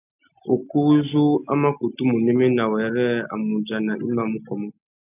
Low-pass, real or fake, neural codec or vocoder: 3.6 kHz; real; none